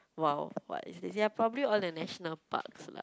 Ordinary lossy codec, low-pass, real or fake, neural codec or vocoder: none; none; real; none